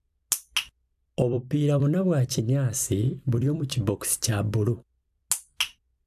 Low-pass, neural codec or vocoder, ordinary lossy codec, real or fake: 14.4 kHz; vocoder, 44.1 kHz, 128 mel bands every 256 samples, BigVGAN v2; AAC, 96 kbps; fake